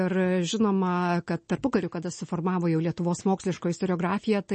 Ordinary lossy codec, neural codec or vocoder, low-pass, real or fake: MP3, 32 kbps; none; 10.8 kHz; real